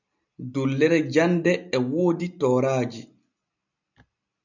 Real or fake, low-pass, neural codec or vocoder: real; 7.2 kHz; none